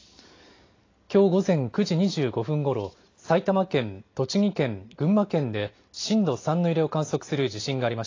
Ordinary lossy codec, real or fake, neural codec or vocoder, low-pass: AAC, 32 kbps; real; none; 7.2 kHz